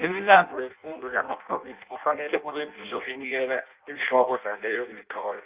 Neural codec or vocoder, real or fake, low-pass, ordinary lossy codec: codec, 16 kHz in and 24 kHz out, 0.6 kbps, FireRedTTS-2 codec; fake; 3.6 kHz; Opus, 32 kbps